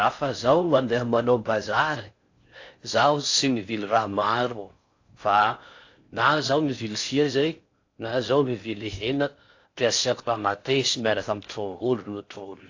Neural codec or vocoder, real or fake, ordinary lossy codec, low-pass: codec, 16 kHz in and 24 kHz out, 0.6 kbps, FocalCodec, streaming, 4096 codes; fake; AAC, 48 kbps; 7.2 kHz